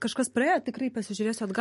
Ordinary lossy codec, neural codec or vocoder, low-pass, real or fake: MP3, 48 kbps; none; 14.4 kHz; real